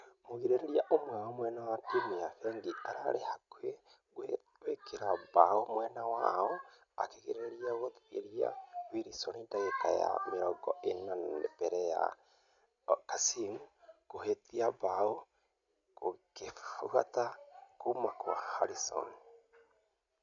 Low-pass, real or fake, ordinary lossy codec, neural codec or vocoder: 7.2 kHz; real; none; none